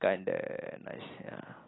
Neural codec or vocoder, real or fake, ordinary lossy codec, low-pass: none; real; AAC, 16 kbps; 7.2 kHz